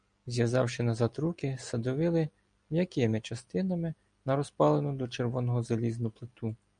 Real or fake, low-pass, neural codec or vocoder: real; 9.9 kHz; none